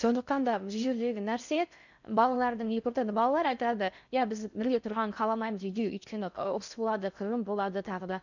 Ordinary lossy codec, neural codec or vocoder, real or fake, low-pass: none; codec, 16 kHz in and 24 kHz out, 0.6 kbps, FocalCodec, streaming, 2048 codes; fake; 7.2 kHz